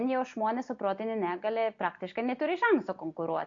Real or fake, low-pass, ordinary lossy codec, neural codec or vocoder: real; 7.2 kHz; AAC, 48 kbps; none